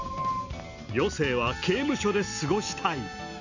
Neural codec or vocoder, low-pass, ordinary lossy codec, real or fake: none; 7.2 kHz; none; real